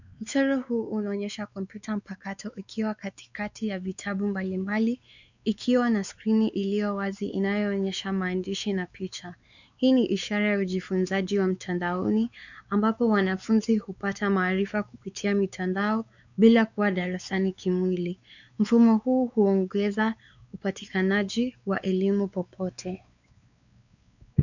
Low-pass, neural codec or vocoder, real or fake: 7.2 kHz; codec, 16 kHz, 4 kbps, X-Codec, WavLM features, trained on Multilingual LibriSpeech; fake